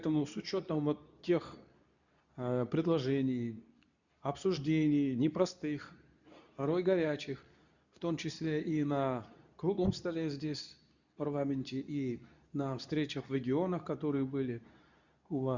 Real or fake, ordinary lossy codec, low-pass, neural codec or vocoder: fake; none; 7.2 kHz; codec, 24 kHz, 0.9 kbps, WavTokenizer, medium speech release version 2